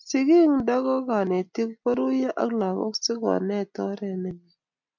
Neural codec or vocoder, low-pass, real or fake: none; 7.2 kHz; real